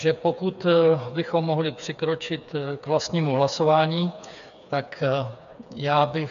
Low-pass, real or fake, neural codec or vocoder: 7.2 kHz; fake; codec, 16 kHz, 4 kbps, FreqCodec, smaller model